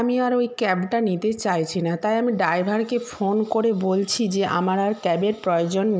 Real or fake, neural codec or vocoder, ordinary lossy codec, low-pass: real; none; none; none